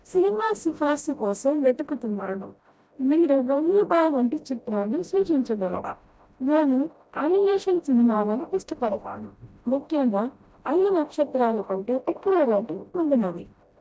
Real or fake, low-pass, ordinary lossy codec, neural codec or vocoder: fake; none; none; codec, 16 kHz, 0.5 kbps, FreqCodec, smaller model